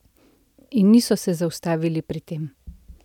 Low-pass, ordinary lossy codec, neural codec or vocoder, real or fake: 19.8 kHz; none; none; real